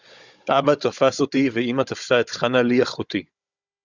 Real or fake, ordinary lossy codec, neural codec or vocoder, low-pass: fake; Opus, 64 kbps; codec, 16 kHz, 16 kbps, FunCodec, trained on Chinese and English, 50 frames a second; 7.2 kHz